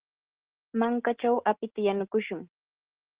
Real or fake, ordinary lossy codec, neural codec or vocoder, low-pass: real; Opus, 16 kbps; none; 3.6 kHz